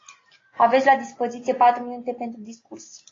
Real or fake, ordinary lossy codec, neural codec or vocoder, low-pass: real; AAC, 32 kbps; none; 7.2 kHz